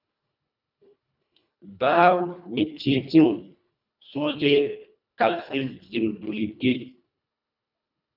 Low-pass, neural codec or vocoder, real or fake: 5.4 kHz; codec, 24 kHz, 1.5 kbps, HILCodec; fake